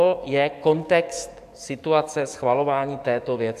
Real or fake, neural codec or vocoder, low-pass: fake; codec, 44.1 kHz, 7.8 kbps, DAC; 14.4 kHz